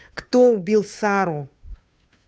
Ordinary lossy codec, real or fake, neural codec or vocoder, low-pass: none; fake; codec, 16 kHz, 2 kbps, FunCodec, trained on Chinese and English, 25 frames a second; none